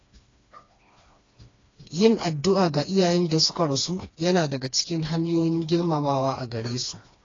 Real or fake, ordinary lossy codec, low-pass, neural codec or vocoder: fake; AAC, 32 kbps; 7.2 kHz; codec, 16 kHz, 2 kbps, FreqCodec, smaller model